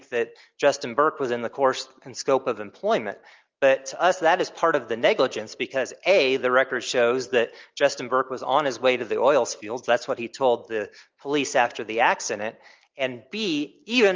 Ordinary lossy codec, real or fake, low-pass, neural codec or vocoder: Opus, 32 kbps; real; 7.2 kHz; none